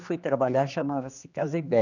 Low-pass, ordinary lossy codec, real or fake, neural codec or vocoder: 7.2 kHz; none; fake; codec, 16 kHz, 2 kbps, X-Codec, HuBERT features, trained on general audio